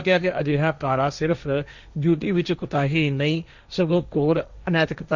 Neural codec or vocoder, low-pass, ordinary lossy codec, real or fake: codec, 16 kHz, 1.1 kbps, Voila-Tokenizer; 7.2 kHz; none; fake